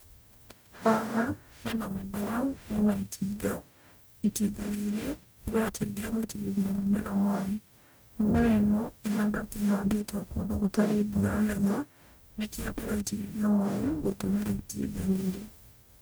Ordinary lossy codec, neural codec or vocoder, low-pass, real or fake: none; codec, 44.1 kHz, 0.9 kbps, DAC; none; fake